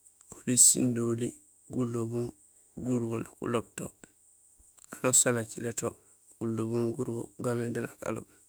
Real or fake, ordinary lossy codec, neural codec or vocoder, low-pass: fake; none; autoencoder, 48 kHz, 32 numbers a frame, DAC-VAE, trained on Japanese speech; none